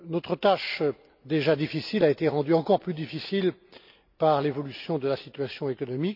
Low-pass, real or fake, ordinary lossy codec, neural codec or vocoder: 5.4 kHz; real; none; none